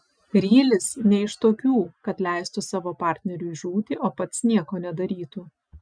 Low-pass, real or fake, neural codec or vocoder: 9.9 kHz; real; none